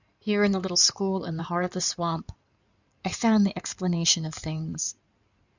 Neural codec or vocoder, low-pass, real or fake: codec, 16 kHz in and 24 kHz out, 2.2 kbps, FireRedTTS-2 codec; 7.2 kHz; fake